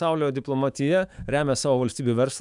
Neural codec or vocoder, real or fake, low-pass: autoencoder, 48 kHz, 128 numbers a frame, DAC-VAE, trained on Japanese speech; fake; 10.8 kHz